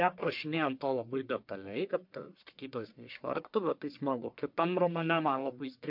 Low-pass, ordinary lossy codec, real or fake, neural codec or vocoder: 5.4 kHz; MP3, 48 kbps; fake; codec, 44.1 kHz, 1.7 kbps, Pupu-Codec